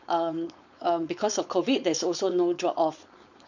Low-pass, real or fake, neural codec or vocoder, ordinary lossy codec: 7.2 kHz; fake; codec, 16 kHz, 4.8 kbps, FACodec; none